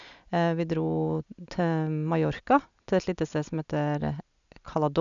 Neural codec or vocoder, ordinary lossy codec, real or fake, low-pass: none; none; real; 7.2 kHz